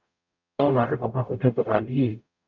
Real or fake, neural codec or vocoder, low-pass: fake; codec, 44.1 kHz, 0.9 kbps, DAC; 7.2 kHz